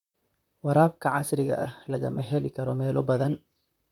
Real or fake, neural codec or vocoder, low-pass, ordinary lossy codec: fake; vocoder, 44.1 kHz, 128 mel bands, Pupu-Vocoder; 19.8 kHz; none